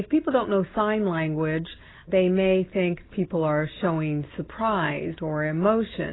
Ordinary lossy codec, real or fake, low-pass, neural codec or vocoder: AAC, 16 kbps; real; 7.2 kHz; none